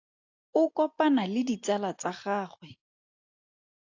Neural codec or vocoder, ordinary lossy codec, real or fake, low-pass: none; AAC, 48 kbps; real; 7.2 kHz